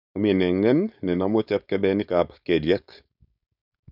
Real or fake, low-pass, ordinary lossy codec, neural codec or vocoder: fake; 5.4 kHz; none; codec, 16 kHz, 4.8 kbps, FACodec